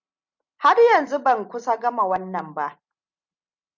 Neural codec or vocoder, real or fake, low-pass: none; real; 7.2 kHz